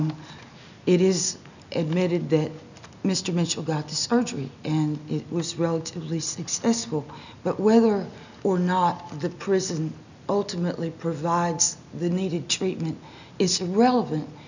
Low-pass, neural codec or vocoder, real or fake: 7.2 kHz; none; real